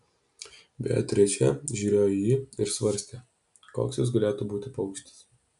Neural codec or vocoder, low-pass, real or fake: none; 10.8 kHz; real